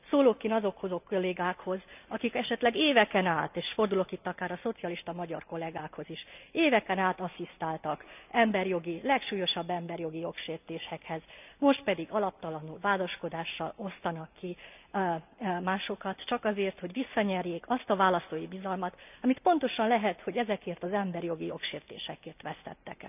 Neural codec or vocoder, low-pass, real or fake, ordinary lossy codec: none; 3.6 kHz; real; none